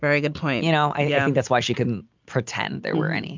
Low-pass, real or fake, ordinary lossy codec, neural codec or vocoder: 7.2 kHz; real; MP3, 64 kbps; none